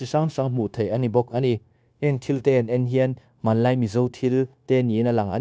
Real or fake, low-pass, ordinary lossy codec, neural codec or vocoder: fake; none; none; codec, 16 kHz, 0.9 kbps, LongCat-Audio-Codec